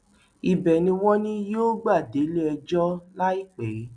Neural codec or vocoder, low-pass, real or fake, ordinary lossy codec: none; 9.9 kHz; real; none